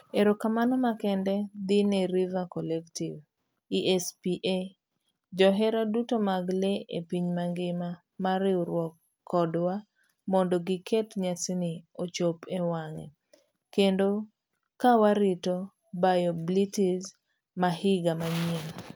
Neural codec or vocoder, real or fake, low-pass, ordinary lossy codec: none; real; none; none